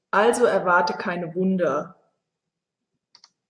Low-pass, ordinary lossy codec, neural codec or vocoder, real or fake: 9.9 kHz; AAC, 64 kbps; none; real